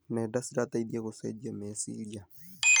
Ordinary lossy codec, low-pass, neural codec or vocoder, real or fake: none; none; none; real